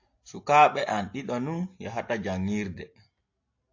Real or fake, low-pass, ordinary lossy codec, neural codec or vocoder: real; 7.2 kHz; AAC, 48 kbps; none